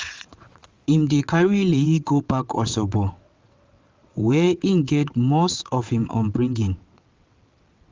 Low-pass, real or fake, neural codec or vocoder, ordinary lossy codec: 7.2 kHz; fake; vocoder, 22.05 kHz, 80 mel bands, WaveNeXt; Opus, 32 kbps